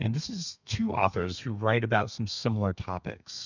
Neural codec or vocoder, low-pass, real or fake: codec, 32 kHz, 1.9 kbps, SNAC; 7.2 kHz; fake